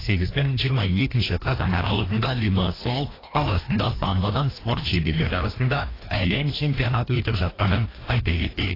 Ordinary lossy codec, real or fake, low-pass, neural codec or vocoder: AAC, 24 kbps; fake; 5.4 kHz; codec, 16 kHz, 1 kbps, FreqCodec, larger model